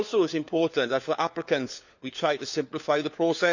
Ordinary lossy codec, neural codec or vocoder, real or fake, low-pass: none; codec, 16 kHz, 4 kbps, FunCodec, trained on LibriTTS, 50 frames a second; fake; 7.2 kHz